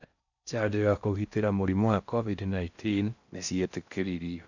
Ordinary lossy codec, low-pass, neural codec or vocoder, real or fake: none; 7.2 kHz; codec, 16 kHz in and 24 kHz out, 0.6 kbps, FocalCodec, streaming, 4096 codes; fake